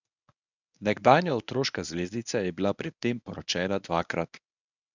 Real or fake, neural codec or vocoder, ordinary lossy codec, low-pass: fake; codec, 24 kHz, 0.9 kbps, WavTokenizer, medium speech release version 1; none; 7.2 kHz